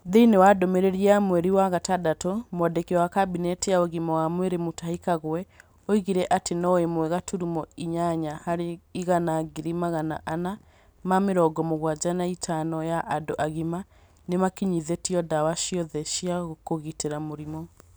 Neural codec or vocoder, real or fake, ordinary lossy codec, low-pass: none; real; none; none